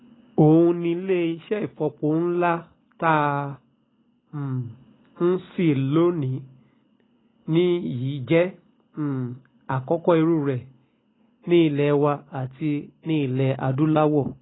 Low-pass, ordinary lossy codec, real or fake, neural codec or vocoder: 7.2 kHz; AAC, 16 kbps; real; none